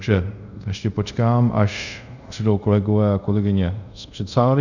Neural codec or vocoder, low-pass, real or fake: codec, 24 kHz, 0.5 kbps, DualCodec; 7.2 kHz; fake